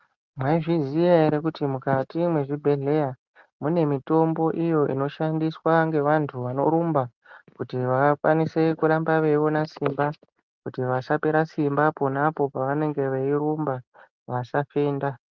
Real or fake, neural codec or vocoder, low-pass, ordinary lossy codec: real; none; 7.2 kHz; Opus, 32 kbps